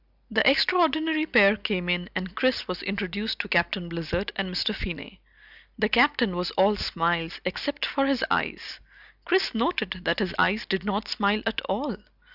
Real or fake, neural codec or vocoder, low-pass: real; none; 5.4 kHz